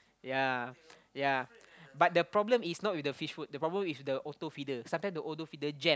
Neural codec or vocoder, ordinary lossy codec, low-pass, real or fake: none; none; none; real